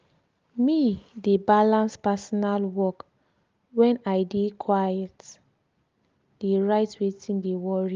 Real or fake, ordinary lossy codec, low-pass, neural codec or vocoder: real; Opus, 32 kbps; 7.2 kHz; none